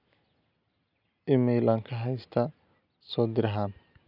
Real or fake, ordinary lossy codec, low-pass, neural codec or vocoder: real; none; 5.4 kHz; none